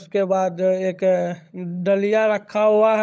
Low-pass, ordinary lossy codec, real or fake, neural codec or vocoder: none; none; fake; codec, 16 kHz, 16 kbps, FunCodec, trained on LibriTTS, 50 frames a second